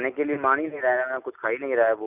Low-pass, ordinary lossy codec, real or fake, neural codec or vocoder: 3.6 kHz; none; real; none